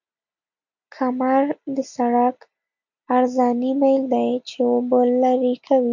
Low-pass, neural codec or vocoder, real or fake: 7.2 kHz; none; real